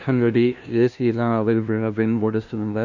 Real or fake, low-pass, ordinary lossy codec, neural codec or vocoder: fake; 7.2 kHz; none; codec, 16 kHz, 0.5 kbps, FunCodec, trained on LibriTTS, 25 frames a second